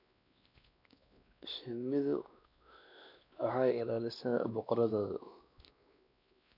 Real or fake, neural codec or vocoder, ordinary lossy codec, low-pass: fake; codec, 16 kHz, 2 kbps, X-Codec, WavLM features, trained on Multilingual LibriSpeech; none; 5.4 kHz